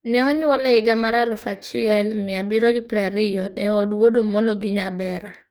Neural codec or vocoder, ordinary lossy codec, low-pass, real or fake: codec, 44.1 kHz, 2.6 kbps, DAC; none; none; fake